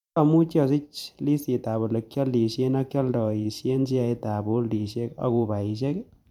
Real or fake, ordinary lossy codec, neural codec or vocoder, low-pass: fake; none; vocoder, 44.1 kHz, 128 mel bands every 512 samples, BigVGAN v2; 19.8 kHz